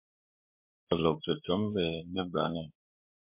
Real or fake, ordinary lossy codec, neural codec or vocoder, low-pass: fake; MP3, 32 kbps; codec, 16 kHz, 8 kbps, FreqCodec, larger model; 3.6 kHz